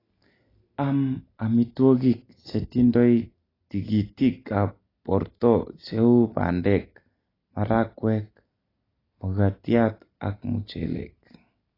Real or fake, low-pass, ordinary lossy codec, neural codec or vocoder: real; 5.4 kHz; AAC, 24 kbps; none